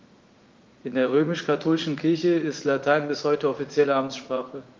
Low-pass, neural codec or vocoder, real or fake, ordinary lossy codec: 7.2 kHz; vocoder, 44.1 kHz, 80 mel bands, Vocos; fake; Opus, 32 kbps